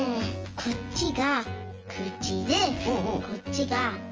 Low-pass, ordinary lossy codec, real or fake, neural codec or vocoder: 7.2 kHz; Opus, 24 kbps; fake; vocoder, 24 kHz, 100 mel bands, Vocos